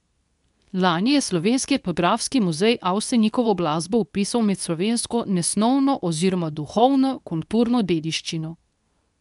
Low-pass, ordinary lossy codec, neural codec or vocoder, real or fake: 10.8 kHz; none; codec, 24 kHz, 0.9 kbps, WavTokenizer, medium speech release version 2; fake